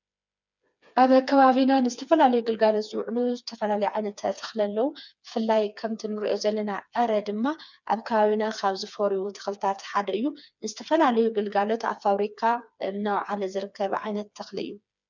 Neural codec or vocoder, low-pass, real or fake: codec, 16 kHz, 4 kbps, FreqCodec, smaller model; 7.2 kHz; fake